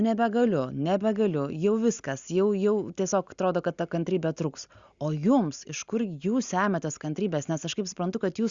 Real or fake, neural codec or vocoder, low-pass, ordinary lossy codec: real; none; 7.2 kHz; Opus, 64 kbps